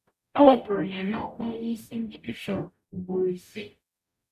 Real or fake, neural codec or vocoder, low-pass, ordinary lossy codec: fake; codec, 44.1 kHz, 0.9 kbps, DAC; 14.4 kHz; none